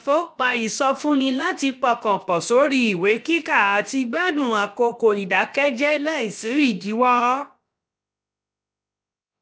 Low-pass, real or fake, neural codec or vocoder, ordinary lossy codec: none; fake; codec, 16 kHz, about 1 kbps, DyCAST, with the encoder's durations; none